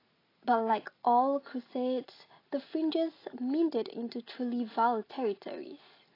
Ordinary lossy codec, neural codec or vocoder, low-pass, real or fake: AAC, 24 kbps; none; 5.4 kHz; real